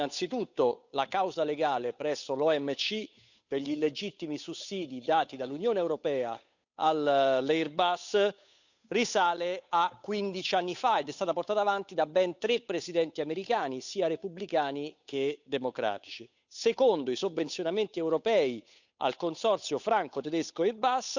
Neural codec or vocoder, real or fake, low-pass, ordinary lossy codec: codec, 16 kHz, 8 kbps, FunCodec, trained on Chinese and English, 25 frames a second; fake; 7.2 kHz; none